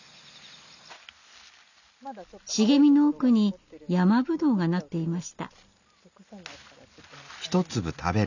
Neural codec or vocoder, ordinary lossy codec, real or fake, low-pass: none; none; real; 7.2 kHz